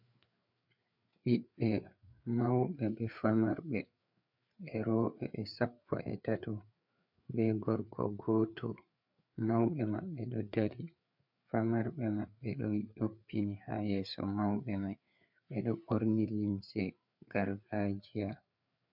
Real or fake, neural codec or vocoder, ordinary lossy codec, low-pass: fake; codec, 16 kHz, 4 kbps, FreqCodec, larger model; MP3, 48 kbps; 5.4 kHz